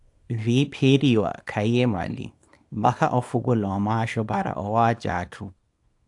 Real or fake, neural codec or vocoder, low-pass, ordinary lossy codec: fake; codec, 24 kHz, 0.9 kbps, WavTokenizer, small release; 10.8 kHz; AAC, 64 kbps